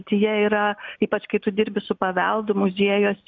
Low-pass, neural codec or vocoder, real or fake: 7.2 kHz; none; real